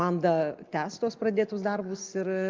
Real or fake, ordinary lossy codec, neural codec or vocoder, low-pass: real; Opus, 32 kbps; none; 7.2 kHz